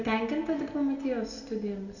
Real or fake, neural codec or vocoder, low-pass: real; none; 7.2 kHz